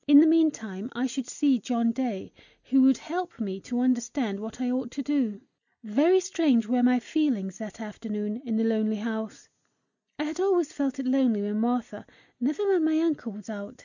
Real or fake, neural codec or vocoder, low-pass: real; none; 7.2 kHz